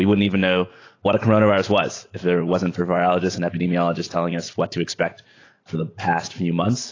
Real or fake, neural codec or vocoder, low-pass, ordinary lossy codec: real; none; 7.2 kHz; AAC, 32 kbps